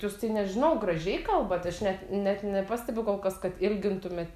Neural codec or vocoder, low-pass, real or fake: none; 14.4 kHz; real